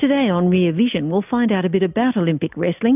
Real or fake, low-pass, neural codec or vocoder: real; 3.6 kHz; none